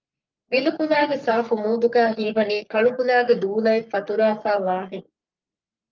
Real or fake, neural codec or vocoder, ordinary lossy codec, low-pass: fake; codec, 44.1 kHz, 3.4 kbps, Pupu-Codec; Opus, 24 kbps; 7.2 kHz